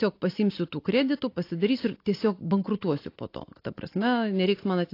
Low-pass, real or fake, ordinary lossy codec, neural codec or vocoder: 5.4 kHz; real; AAC, 32 kbps; none